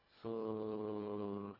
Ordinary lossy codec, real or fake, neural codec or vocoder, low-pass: none; fake; codec, 24 kHz, 1.5 kbps, HILCodec; 5.4 kHz